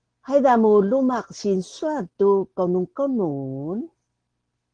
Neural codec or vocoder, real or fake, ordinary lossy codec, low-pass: none; real; Opus, 16 kbps; 9.9 kHz